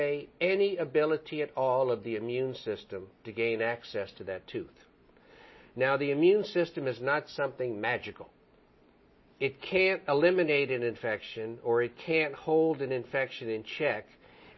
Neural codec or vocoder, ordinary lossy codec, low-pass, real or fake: none; MP3, 24 kbps; 7.2 kHz; real